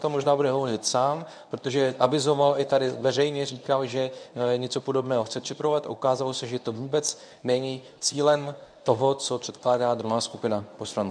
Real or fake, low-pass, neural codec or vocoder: fake; 9.9 kHz; codec, 24 kHz, 0.9 kbps, WavTokenizer, medium speech release version 1